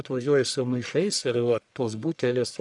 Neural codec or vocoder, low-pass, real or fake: codec, 44.1 kHz, 1.7 kbps, Pupu-Codec; 10.8 kHz; fake